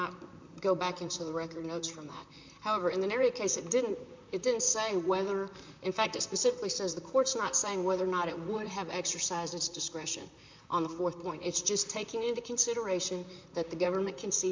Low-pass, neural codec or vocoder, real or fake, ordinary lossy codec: 7.2 kHz; vocoder, 44.1 kHz, 128 mel bands, Pupu-Vocoder; fake; MP3, 64 kbps